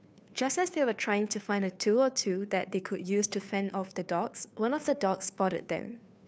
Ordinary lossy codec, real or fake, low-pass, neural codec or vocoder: none; fake; none; codec, 16 kHz, 2 kbps, FunCodec, trained on Chinese and English, 25 frames a second